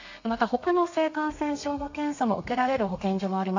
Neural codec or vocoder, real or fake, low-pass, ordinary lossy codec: codec, 32 kHz, 1.9 kbps, SNAC; fake; 7.2 kHz; AAC, 48 kbps